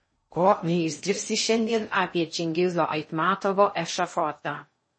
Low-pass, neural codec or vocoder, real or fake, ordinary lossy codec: 9.9 kHz; codec, 16 kHz in and 24 kHz out, 0.6 kbps, FocalCodec, streaming, 2048 codes; fake; MP3, 32 kbps